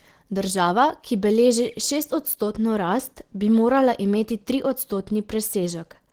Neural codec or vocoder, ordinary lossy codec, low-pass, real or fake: none; Opus, 16 kbps; 19.8 kHz; real